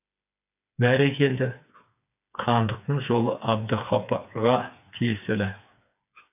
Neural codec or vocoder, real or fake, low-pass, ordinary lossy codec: codec, 16 kHz, 4 kbps, FreqCodec, smaller model; fake; 3.6 kHz; none